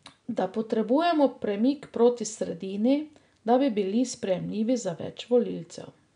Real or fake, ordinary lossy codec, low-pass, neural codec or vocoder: real; none; 9.9 kHz; none